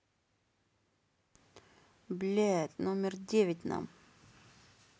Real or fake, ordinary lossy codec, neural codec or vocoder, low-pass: real; none; none; none